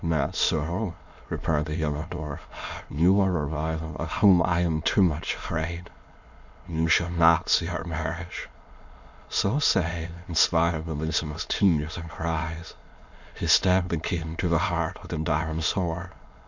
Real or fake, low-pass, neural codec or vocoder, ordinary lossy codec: fake; 7.2 kHz; autoencoder, 22.05 kHz, a latent of 192 numbers a frame, VITS, trained on many speakers; Opus, 64 kbps